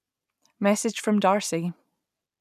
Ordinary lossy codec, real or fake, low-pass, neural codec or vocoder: none; real; 14.4 kHz; none